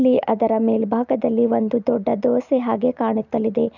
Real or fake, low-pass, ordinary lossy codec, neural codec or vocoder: real; 7.2 kHz; none; none